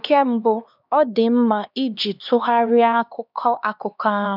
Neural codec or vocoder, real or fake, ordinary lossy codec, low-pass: codec, 16 kHz, 2 kbps, X-Codec, HuBERT features, trained on LibriSpeech; fake; none; 5.4 kHz